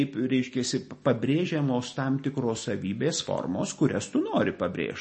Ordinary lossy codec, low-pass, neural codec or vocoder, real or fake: MP3, 32 kbps; 10.8 kHz; none; real